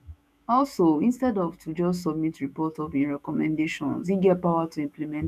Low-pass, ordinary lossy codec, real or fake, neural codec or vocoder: 14.4 kHz; none; fake; autoencoder, 48 kHz, 128 numbers a frame, DAC-VAE, trained on Japanese speech